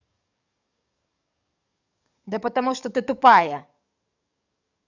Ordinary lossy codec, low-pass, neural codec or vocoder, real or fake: none; 7.2 kHz; codec, 44.1 kHz, 7.8 kbps, DAC; fake